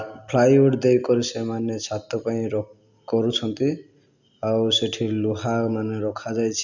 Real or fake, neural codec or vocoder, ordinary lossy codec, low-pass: real; none; none; 7.2 kHz